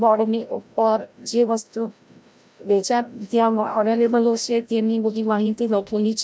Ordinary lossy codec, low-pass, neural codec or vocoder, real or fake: none; none; codec, 16 kHz, 0.5 kbps, FreqCodec, larger model; fake